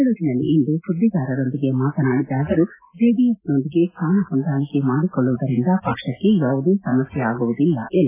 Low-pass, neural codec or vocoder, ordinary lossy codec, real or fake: 3.6 kHz; none; AAC, 24 kbps; real